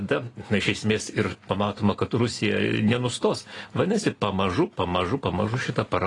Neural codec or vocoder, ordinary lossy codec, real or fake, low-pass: none; AAC, 32 kbps; real; 10.8 kHz